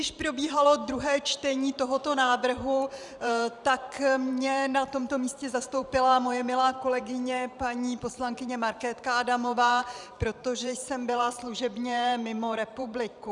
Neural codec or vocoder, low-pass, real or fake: vocoder, 44.1 kHz, 128 mel bands every 512 samples, BigVGAN v2; 10.8 kHz; fake